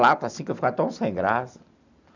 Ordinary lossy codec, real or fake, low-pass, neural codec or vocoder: none; real; 7.2 kHz; none